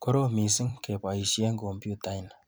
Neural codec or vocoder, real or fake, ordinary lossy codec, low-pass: vocoder, 44.1 kHz, 128 mel bands every 256 samples, BigVGAN v2; fake; none; none